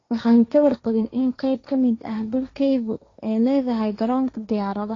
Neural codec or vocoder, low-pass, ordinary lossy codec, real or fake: codec, 16 kHz, 1.1 kbps, Voila-Tokenizer; 7.2 kHz; AAC, 32 kbps; fake